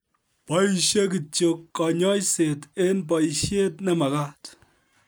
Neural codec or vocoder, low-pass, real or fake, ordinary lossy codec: none; none; real; none